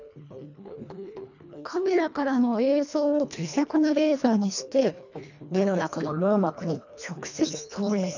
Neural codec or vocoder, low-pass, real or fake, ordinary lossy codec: codec, 24 kHz, 1.5 kbps, HILCodec; 7.2 kHz; fake; none